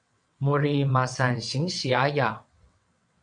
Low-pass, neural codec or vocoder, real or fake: 9.9 kHz; vocoder, 22.05 kHz, 80 mel bands, WaveNeXt; fake